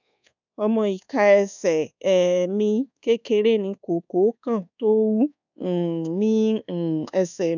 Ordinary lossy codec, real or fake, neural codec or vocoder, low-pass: none; fake; codec, 24 kHz, 1.2 kbps, DualCodec; 7.2 kHz